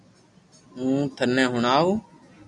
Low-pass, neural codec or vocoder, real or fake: 10.8 kHz; none; real